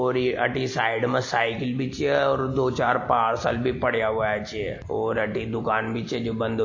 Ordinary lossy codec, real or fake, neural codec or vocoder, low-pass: MP3, 32 kbps; real; none; 7.2 kHz